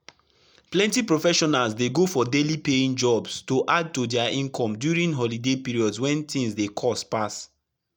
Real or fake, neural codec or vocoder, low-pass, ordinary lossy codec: real; none; 19.8 kHz; none